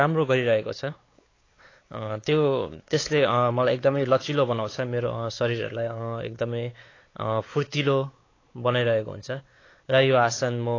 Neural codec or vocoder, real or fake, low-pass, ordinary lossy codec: codec, 16 kHz, 4 kbps, X-Codec, WavLM features, trained on Multilingual LibriSpeech; fake; 7.2 kHz; AAC, 32 kbps